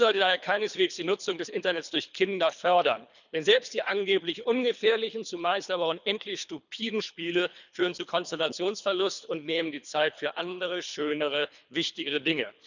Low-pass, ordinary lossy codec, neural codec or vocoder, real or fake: 7.2 kHz; none; codec, 24 kHz, 3 kbps, HILCodec; fake